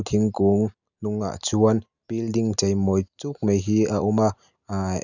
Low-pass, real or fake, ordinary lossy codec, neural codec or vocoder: 7.2 kHz; real; none; none